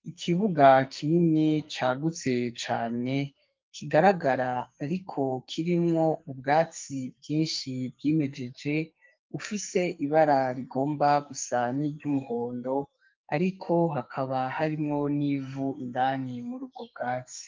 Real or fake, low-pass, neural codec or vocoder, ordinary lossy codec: fake; 7.2 kHz; codec, 44.1 kHz, 2.6 kbps, SNAC; Opus, 24 kbps